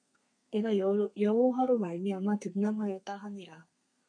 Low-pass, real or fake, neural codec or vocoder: 9.9 kHz; fake; codec, 32 kHz, 1.9 kbps, SNAC